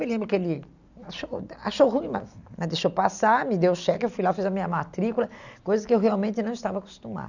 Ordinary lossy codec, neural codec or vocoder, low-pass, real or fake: none; none; 7.2 kHz; real